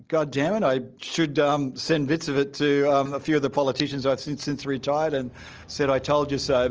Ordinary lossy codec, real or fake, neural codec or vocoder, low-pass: Opus, 24 kbps; real; none; 7.2 kHz